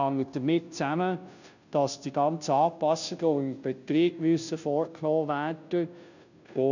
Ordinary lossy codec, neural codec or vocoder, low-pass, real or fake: none; codec, 16 kHz, 0.5 kbps, FunCodec, trained on Chinese and English, 25 frames a second; 7.2 kHz; fake